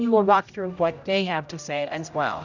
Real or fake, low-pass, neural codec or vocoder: fake; 7.2 kHz; codec, 16 kHz, 0.5 kbps, X-Codec, HuBERT features, trained on general audio